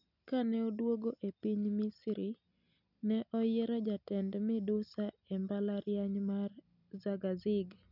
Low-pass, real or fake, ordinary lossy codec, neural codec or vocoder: 5.4 kHz; real; none; none